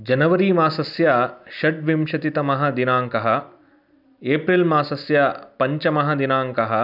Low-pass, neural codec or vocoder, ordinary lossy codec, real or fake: 5.4 kHz; none; none; real